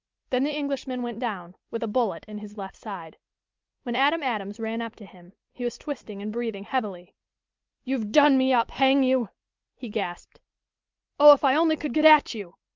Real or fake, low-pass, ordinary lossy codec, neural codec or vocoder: real; 7.2 kHz; Opus, 24 kbps; none